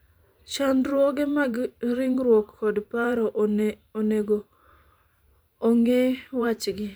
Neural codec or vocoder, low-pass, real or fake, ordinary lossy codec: vocoder, 44.1 kHz, 128 mel bands every 512 samples, BigVGAN v2; none; fake; none